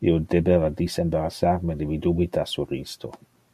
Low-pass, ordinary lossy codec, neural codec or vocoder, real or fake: 14.4 kHz; MP3, 64 kbps; none; real